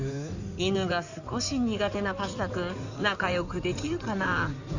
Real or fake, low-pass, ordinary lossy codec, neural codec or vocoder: fake; 7.2 kHz; none; codec, 16 kHz in and 24 kHz out, 2.2 kbps, FireRedTTS-2 codec